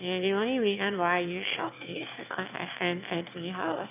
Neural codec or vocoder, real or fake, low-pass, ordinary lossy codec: autoencoder, 22.05 kHz, a latent of 192 numbers a frame, VITS, trained on one speaker; fake; 3.6 kHz; none